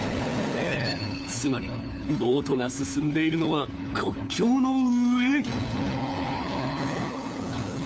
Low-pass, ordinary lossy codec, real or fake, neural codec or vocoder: none; none; fake; codec, 16 kHz, 4 kbps, FunCodec, trained on LibriTTS, 50 frames a second